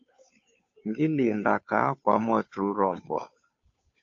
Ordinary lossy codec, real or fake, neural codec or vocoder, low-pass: AAC, 48 kbps; fake; codec, 16 kHz, 2 kbps, FunCodec, trained on Chinese and English, 25 frames a second; 7.2 kHz